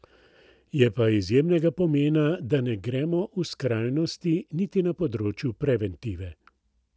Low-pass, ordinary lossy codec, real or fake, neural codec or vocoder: none; none; real; none